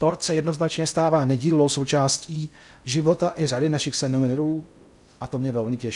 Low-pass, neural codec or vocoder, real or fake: 10.8 kHz; codec, 16 kHz in and 24 kHz out, 0.6 kbps, FocalCodec, streaming, 4096 codes; fake